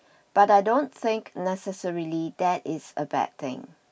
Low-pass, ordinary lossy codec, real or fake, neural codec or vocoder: none; none; real; none